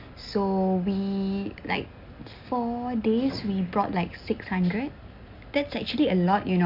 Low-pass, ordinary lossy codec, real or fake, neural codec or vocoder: 5.4 kHz; MP3, 48 kbps; real; none